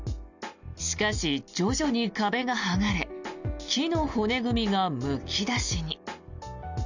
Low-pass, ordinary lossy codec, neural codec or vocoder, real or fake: 7.2 kHz; none; none; real